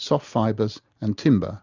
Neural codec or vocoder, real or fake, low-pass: none; real; 7.2 kHz